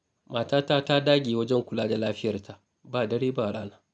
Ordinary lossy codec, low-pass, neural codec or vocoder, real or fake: none; none; none; real